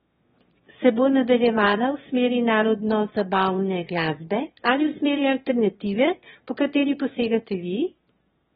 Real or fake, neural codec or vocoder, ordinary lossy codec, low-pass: fake; autoencoder, 22.05 kHz, a latent of 192 numbers a frame, VITS, trained on one speaker; AAC, 16 kbps; 9.9 kHz